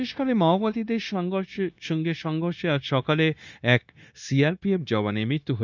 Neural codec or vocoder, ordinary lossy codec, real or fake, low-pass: codec, 16 kHz, 0.9 kbps, LongCat-Audio-Codec; none; fake; 7.2 kHz